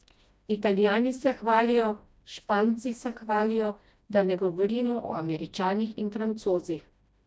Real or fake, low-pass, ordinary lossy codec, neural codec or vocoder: fake; none; none; codec, 16 kHz, 1 kbps, FreqCodec, smaller model